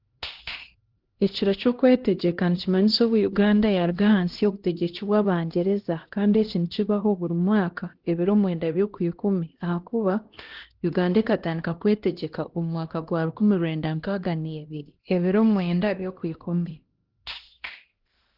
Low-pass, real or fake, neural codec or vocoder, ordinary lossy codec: 5.4 kHz; fake; codec, 16 kHz, 1 kbps, X-Codec, HuBERT features, trained on LibriSpeech; Opus, 16 kbps